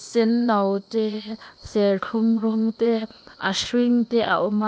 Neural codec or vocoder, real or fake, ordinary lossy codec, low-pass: codec, 16 kHz, 0.8 kbps, ZipCodec; fake; none; none